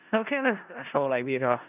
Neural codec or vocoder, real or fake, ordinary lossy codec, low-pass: codec, 16 kHz in and 24 kHz out, 0.4 kbps, LongCat-Audio-Codec, four codebook decoder; fake; none; 3.6 kHz